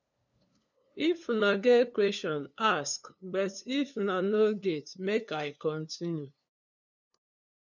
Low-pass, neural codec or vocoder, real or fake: 7.2 kHz; codec, 16 kHz, 2 kbps, FunCodec, trained on LibriTTS, 25 frames a second; fake